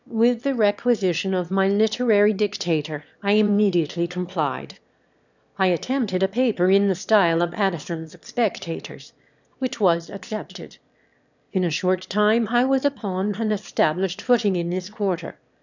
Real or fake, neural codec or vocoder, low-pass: fake; autoencoder, 22.05 kHz, a latent of 192 numbers a frame, VITS, trained on one speaker; 7.2 kHz